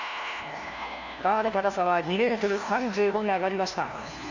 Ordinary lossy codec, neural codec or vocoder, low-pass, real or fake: none; codec, 16 kHz, 1 kbps, FunCodec, trained on LibriTTS, 50 frames a second; 7.2 kHz; fake